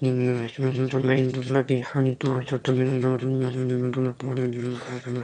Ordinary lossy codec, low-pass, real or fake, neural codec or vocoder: none; 9.9 kHz; fake; autoencoder, 22.05 kHz, a latent of 192 numbers a frame, VITS, trained on one speaker